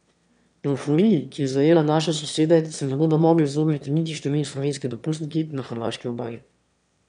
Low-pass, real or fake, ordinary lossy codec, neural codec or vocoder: 9.9 kHz; fake; none; autoencoder, 22.05 kHz, a latent of 192 numbers a frame, VITS, trained on one speaker